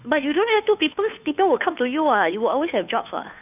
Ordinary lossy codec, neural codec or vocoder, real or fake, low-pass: none; codec, 16 kHz, 2 kbps, FunCodec, trained on Chinese and English, 25 frames a second; fake; 3.6 kHz